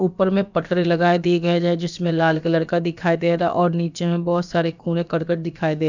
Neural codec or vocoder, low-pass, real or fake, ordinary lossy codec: codec, 16 kHz, about 1 kbps, DyCAST, with the encoder's durations; 7.2 kHz; fake; none